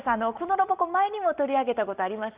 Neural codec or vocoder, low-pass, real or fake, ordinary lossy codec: none; 3.6 kHz; real; Opus, 24 kbps